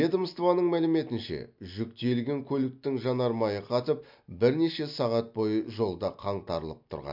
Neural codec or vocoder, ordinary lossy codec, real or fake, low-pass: none; MP3, 48 kbps; real; 5.4 kHz